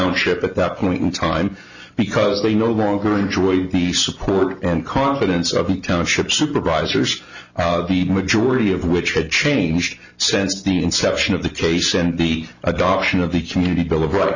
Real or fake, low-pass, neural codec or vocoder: real; 7.2 kHz; none